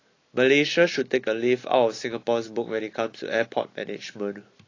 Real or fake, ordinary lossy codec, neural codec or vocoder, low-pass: real; AAC, 32 kbps; none; 7.2 kHz